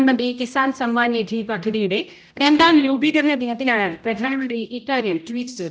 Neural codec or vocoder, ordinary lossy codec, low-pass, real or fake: codec, 16 kHz, 0.5 kbps, X-Codec, HuBERT features, trained on general audio; none; none; fake